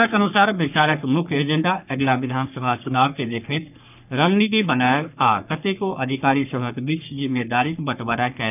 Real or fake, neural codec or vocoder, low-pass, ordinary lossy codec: fake; codec, 44.1 kHz, 3.4 kbps, Pupu-Codec; 3.6 kHz; none